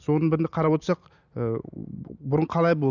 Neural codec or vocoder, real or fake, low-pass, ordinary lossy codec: none; real; 7.2 kHz; none